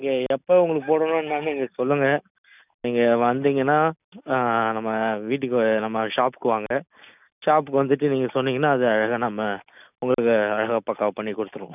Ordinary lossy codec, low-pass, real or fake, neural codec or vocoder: none; 3.6 kHz; real; none